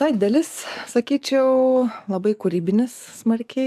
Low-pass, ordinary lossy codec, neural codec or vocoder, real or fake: 14.4 kHz; MP3, 96 kbps; codec, 44.1 kHz, 7.8 kbps, DAC; fake